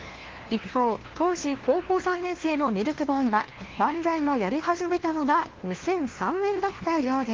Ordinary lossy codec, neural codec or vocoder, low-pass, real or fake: Opus, 16 kbps; codec, 16 kHz, 1 kbps, FunCodec, trained on LibriTTS, 50 frames a second; 7.2 kHz; fake